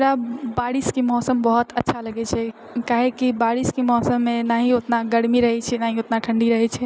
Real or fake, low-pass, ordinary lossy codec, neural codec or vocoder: real; none; none; none